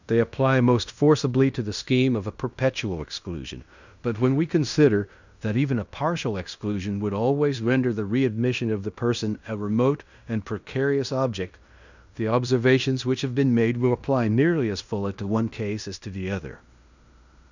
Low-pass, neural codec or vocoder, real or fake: 7.2 kHz; codec, 16 kHz in and 24 kHz out, 0.9 kbps, LongCat-Audio-Codec, fine tuned four codebook decoder; fake